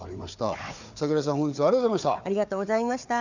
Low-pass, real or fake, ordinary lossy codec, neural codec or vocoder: 7.2 kHz; fake; none; codec, 16 kHz, 4 kbps, FunCodec, trained on Chinese and English, 50 frames a second